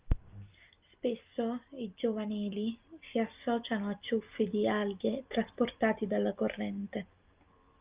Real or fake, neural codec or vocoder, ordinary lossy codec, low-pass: real; none; Opus, 24 kbps; 3.6 kHz